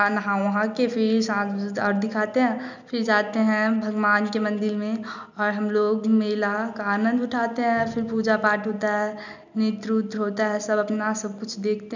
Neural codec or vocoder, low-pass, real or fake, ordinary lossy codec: none; 7.2 kHz; real; none